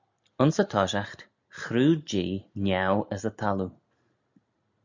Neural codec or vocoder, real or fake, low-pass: none; real; 7.2 kHz